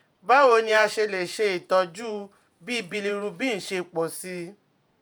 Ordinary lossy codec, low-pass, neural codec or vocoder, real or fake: none; none; vocoder, 48 kHz, 128 mel bands, Vocos; fake